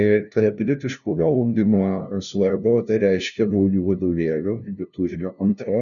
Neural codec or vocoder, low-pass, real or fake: codec, 16 kHz, 0.5 kbps, FunCodec, trained on LibriTTS, 25 frames a second; 7.2 kHz; fake